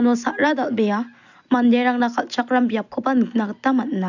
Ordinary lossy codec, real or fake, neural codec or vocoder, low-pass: none; real; none; 7.2 kHz